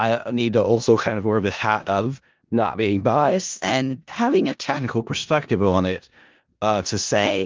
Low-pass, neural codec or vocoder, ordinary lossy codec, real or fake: 7.2 kHz; codec, 16 kHz in and 24 kHz out, 0.4 kbps, LongCat-Audio-Codec, four codebook decoder; Opus, 32 kbps; fake